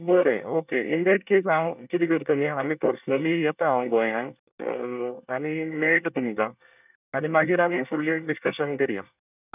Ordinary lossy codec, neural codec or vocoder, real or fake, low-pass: none; codec, 24 kHz, 1 kbps, SNAC; fake; 3.6 kHz